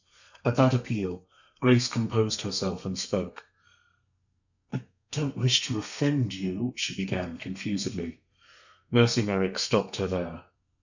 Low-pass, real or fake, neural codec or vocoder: 7.2 kHz; fake; codec, 32 kHz, 1.9 kbps, SNAC